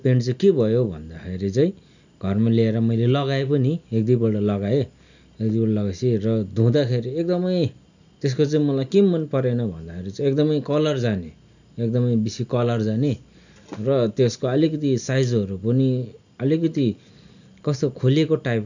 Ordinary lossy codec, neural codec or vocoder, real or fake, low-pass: none; none; real; 7.2 kHz